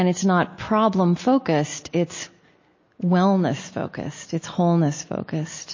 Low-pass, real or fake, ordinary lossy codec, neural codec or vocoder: 7.2 kHz; real; MP3, 32 kbps; none